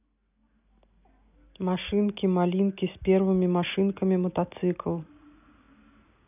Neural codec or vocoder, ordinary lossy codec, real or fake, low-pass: none; none; real; 3.6 kHz